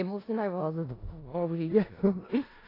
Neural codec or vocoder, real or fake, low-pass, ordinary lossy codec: codec, 16 kHz in and 24 kHz out, 0.4 kbps, LongCat-Audio-Codec, four codebook decoder; fake; 5.4 kHz; AAC, 24 kbps